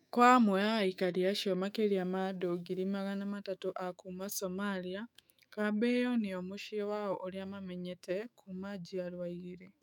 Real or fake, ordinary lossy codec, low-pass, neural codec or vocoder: fake; none; 19.8 kHz; autoencoder, 48 kHz, 128 numbers a frame, DAC-VAE, trained on Japanese speech